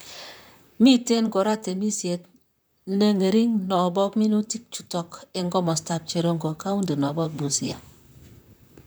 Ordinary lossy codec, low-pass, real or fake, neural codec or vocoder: none; none; fake; vocoder, 44.1 kHz, 128 mel bands, Pupu-Vocoder